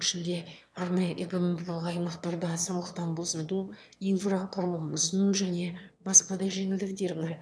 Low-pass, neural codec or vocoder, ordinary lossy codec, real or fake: none; autoencoder, 22.05 kHz, a latent of 192 numbers a frame, VITS, trained on one speaker; none; fake